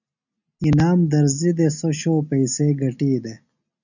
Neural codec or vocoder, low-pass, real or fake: none; 7.2 kHz; real